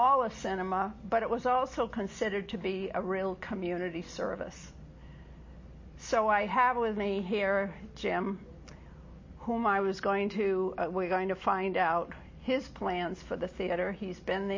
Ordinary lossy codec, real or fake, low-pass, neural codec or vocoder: MP3, 32 kbps; real; 7.2 kHz; none